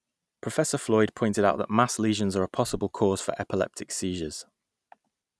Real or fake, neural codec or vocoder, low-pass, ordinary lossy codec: real; none; none; none